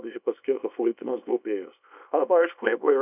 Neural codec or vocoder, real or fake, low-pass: codec, 24 kHz, 0.9 kbps, WavTokenizer, small release; fake; 3.6 kHz